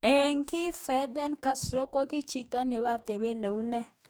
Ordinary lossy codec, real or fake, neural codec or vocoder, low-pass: none; fake; codec, 44.1 kHz, 2.6 kbps, DAC; none